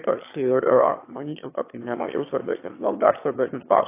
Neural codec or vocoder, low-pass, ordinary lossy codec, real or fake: autoencoder, 22.05 kHz, a latent of 192 numbers a frame, VITS, trained on one speaker; 3.6 kHz; AAC, 24 kbps; fake